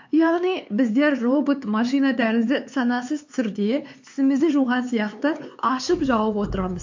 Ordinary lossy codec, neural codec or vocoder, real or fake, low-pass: MP3, 48 kbps; codec, 16 kHz, 4 kbps, X-Codec, HuBERT features, trained on LibriSpeech; fake; 7.2 kHz